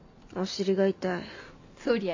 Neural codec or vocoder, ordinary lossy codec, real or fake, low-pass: none; AAC, 48 kbps; real; 7.2 kHz